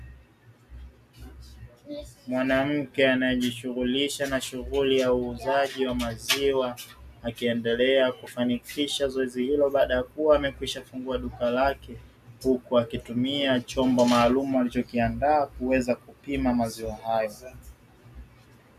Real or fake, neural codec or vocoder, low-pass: real; none; 14.4 kHz